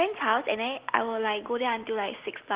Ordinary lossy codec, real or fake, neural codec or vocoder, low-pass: Opus, 16 kbps; real; none; 3.6 kHz